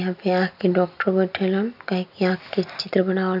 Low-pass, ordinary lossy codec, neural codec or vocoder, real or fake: 5.4 kHz; none; none; real